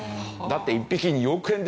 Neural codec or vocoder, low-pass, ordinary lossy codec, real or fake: none; none; none; real